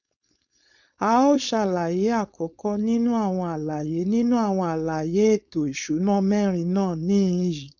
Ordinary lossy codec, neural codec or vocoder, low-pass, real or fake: none; codec, 16 kHz, 4.8 kbps, FACodec; 7.2 kHz; fake